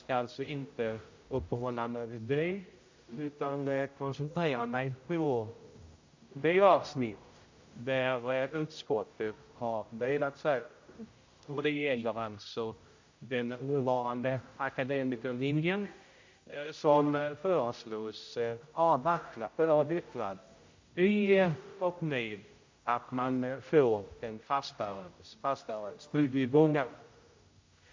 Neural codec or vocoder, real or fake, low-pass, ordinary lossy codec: codec, 16 kHz, 0.5 kbps, X-Codec, HuBERT features, trained on general audio; fake; 7.2 kHz; MP3, 48 kbps